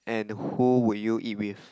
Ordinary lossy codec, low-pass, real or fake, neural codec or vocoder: none; none; real; none